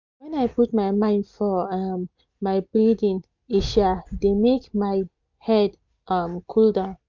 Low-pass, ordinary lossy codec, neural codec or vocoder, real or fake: 7.2 kHz; none; none; real